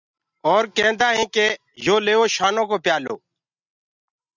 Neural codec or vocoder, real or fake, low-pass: none; real; 7.2 kHz